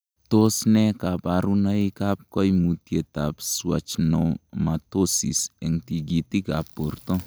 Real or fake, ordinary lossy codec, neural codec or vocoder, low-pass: real; none; none; none